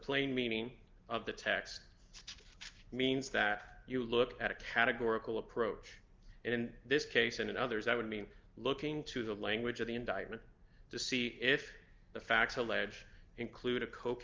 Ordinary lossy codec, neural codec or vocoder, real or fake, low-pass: Opus, 32 kbps; none; real; 7.2 kHz